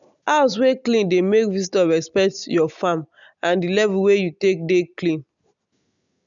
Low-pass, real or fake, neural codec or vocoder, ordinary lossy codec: 7.2 kHz; real; none; none